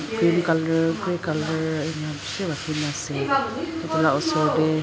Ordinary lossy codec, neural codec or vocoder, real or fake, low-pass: none; none; real; none